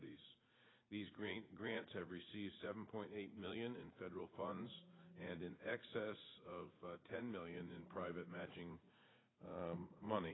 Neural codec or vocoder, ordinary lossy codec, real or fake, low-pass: vocoder, 44.1 kHz, 80 mel bands, Vocos; AAC, 16 kbps; fake; 7.2 kHz